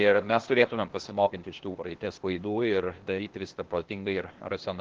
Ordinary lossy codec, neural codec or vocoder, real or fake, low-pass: Opus, 16 kbps; codec, 16 kHz, 0.8 kbps, ZipCodec; fake; 7.2 kHz